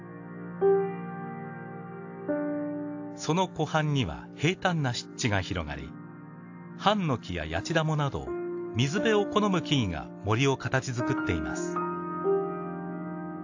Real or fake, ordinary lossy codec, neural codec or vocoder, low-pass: real; AAC, 48 kbps; none; 7.2 kHz